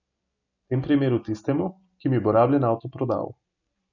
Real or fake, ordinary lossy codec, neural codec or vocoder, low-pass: real; none; none; 7.2 kHz